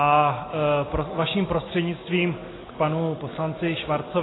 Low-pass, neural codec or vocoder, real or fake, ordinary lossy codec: 7.2 kHz; none; real; AAC, 16 kbps